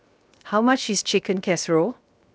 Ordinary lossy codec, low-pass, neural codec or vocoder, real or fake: none; none; codec, 16 kHz, 0.7 kbps, FocalCodec; fake